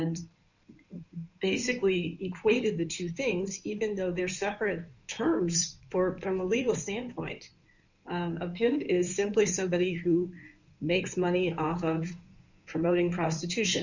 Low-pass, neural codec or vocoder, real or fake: 7.2 kHz; codec, 16 kHz in and 24 kHz out, 2.2 kbps, FireRedTTS-2 codec; fake